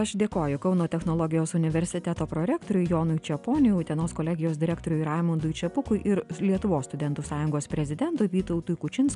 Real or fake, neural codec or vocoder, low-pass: real; none; 10.8 kHz